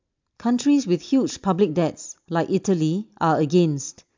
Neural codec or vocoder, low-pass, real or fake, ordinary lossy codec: none; 7.2 kHz; real; MP3, 64 kbps